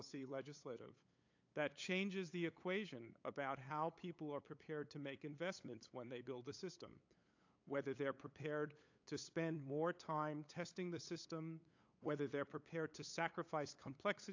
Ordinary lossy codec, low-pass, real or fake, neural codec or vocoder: AAC, 48 kbps; 7.2 kHz; fake; codec, 16 kHz, 8 kbps, FunCodec, trained on LibriTTS, 25 frames a second